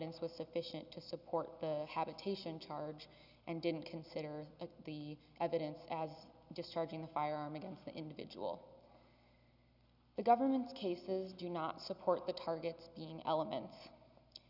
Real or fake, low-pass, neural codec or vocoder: real; 5.4 kHz; none